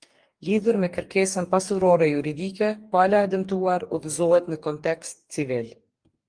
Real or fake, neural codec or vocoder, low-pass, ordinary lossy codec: fake; codec, 44.1 kHz, 2.6 kbps, DAC; 9.9 kHz; Opus, 32 kbps